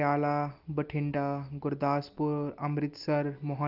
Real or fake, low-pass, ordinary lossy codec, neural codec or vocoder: real; 5.4 kHz; Opus, 24 kbps; none